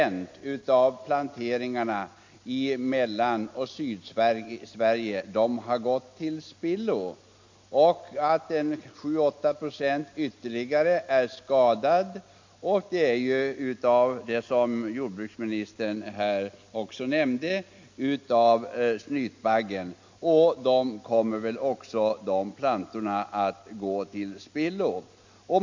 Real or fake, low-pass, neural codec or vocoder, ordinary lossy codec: real; 7.2 kHz; none; MP3, 48 kbps